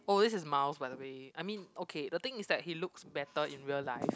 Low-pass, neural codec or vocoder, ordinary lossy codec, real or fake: none; none; none; real